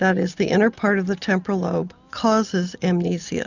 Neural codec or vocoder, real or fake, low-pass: none; real; 7.2 kHz